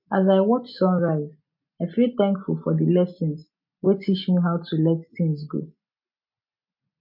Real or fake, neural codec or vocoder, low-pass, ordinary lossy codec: real; none; 5.4 kHz; MP3, 48 kbps